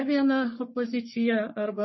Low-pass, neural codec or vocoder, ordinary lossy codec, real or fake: 7.2 kHz; codec, 44.1 kHz, 3.4 kbps, Pupu-Codec; MP3, 24 kbps; fake